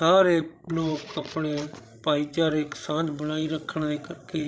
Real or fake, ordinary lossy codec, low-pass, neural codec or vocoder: fake; none; none; codec, 16 kHz, 16 kbps, FreqCodec, larger model